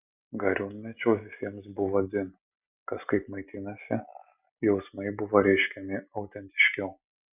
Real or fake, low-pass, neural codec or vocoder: real; 3.6 kHz; none